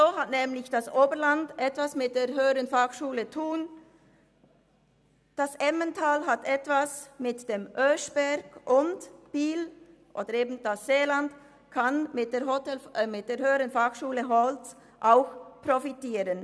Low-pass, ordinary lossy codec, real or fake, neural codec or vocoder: none; none; real; none